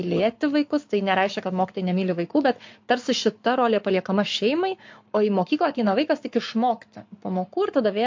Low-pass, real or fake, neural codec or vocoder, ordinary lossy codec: 7.2 kHz; fake; codec, 44.1 kHz, 7.8 kbps, Pupu-Codec; MP3, 48 kbps